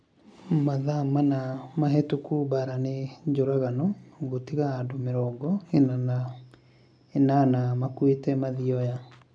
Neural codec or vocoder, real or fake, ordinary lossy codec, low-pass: none; real; none; 9.9 kHz